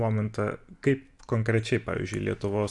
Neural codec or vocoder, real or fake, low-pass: none; real; 10.8 kHz